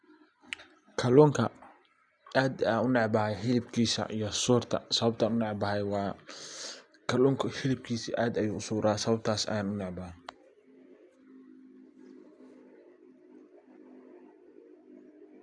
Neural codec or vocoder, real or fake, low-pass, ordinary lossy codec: none; real; 9.9 kHz; none